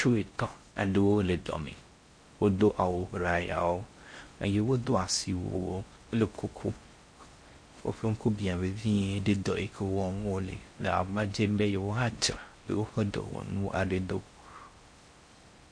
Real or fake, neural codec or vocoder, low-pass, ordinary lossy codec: fake; codec, 16 kHz in and 24 kHz out, 0.6 kbps, FocalCodec, streaming, 4096 codes; 9.9 kHz; MP3, 48 kbps